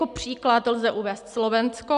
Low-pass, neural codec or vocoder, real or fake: 10.8 kHz; none; real